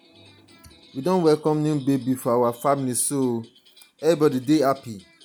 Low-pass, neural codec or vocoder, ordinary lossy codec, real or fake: none; none; none; real